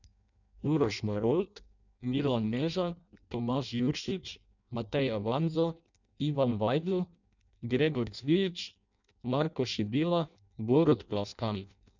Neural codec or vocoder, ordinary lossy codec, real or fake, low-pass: codec, 16 kHz in and 24 kHz out, 0.6 kbps, FireRedTTS-2 codec; none; fake; 7.2 kHz